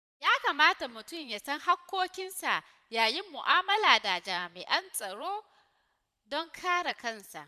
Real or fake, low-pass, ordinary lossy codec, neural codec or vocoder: fake; 14.4 kHz; none; vocoder, 44.1 kHz, 128 mel bands every 512 samples, BigVGAN v2